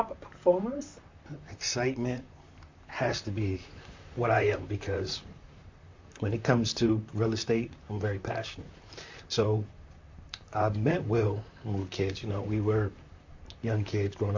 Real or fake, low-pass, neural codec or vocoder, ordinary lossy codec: fake; 7.2 kHz; vocoder, 44.1 kHz, 128 mel bands, Pupu-Vocoder; MP3, 48 kbps